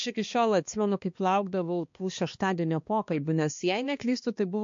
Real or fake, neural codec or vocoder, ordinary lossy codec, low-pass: fake; codec, 16 kHz, 2 kbps, X-Codec, HuBERT features, trained on balanced general audio; MP3, 48 kbps; 7.2 kHz